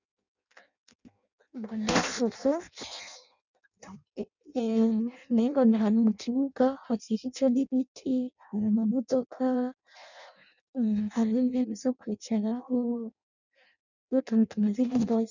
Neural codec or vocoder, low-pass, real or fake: codec, 16 kHz in and 24 kHz out, 0.6 kbps, FireRedTTS-2 codec; 7.2 kHz; fake